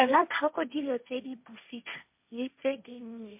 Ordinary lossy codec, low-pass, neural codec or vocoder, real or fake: MP3, 32 kbps; 3.6 kHz; codec, 16 kHz, 1.1 kbps, Voila-Tokenizer; fake